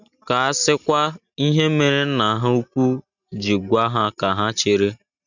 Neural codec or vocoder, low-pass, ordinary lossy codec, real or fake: none; 7.2 kHz; none; real